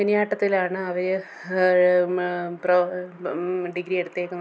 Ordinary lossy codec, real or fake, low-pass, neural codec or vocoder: none; real; none; none